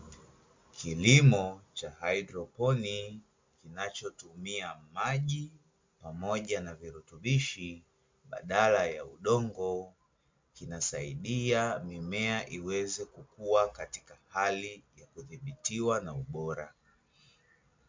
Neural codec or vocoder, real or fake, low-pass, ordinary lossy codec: none; real; 7.2 kHz; MP3, 64 kbps